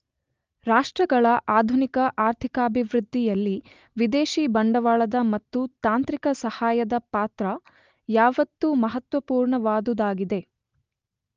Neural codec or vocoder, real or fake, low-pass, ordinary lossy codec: none; real; 7.2 kHz; Opus, 24 kbps